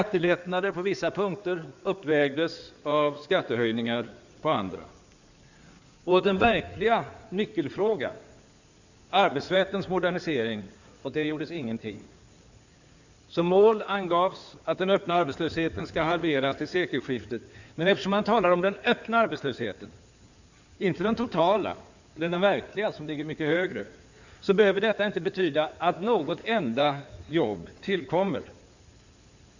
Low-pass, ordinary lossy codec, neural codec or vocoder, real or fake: 7.2 kHz; none; codec, 16 kHz in and 24 kHz out, 2.2 kbps, FireRedTTS-2 codec; fake